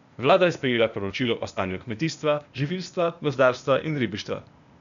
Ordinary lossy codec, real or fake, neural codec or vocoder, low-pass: none; fake; codec, 16 kHz, 0.8 kbps, ZipCodec; 7.2 kHz